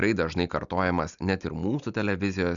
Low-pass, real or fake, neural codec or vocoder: 7.2 kHz; real; none